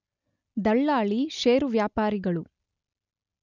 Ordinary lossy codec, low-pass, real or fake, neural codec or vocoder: none; 7.2 kHz; real; none